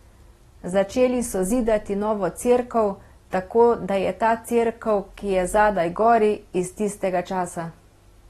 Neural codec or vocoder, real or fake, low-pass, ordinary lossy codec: none; real; 19.8 kHz; AAC, 32 kbps